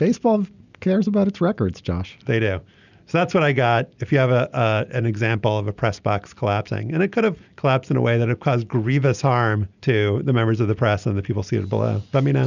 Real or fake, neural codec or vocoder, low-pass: real; none; 7.2 kHz